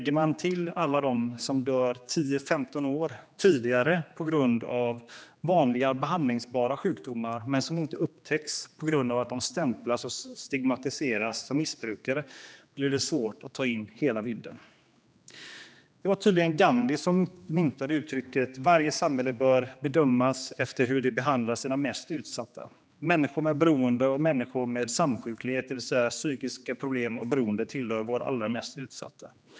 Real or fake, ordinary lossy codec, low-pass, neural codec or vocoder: fake; none; none; codec, 16 kHz, 2 kbps, X-Codec, HuBERT features, trained on general audio